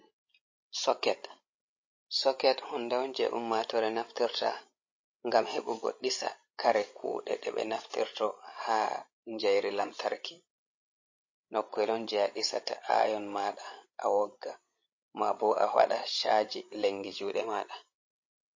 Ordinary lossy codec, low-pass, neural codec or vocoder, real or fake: MP3, 32 kbps; 7.2 kHz; codec, 16 kHz, 16 kbps, FreqCodec, larger model; fake